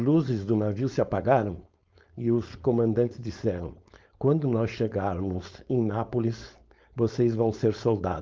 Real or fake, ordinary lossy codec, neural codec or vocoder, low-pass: fake; Opus, 32 kbps; codec, 16 kHz, 4.8 kbps, FACodec; 7.2 kHz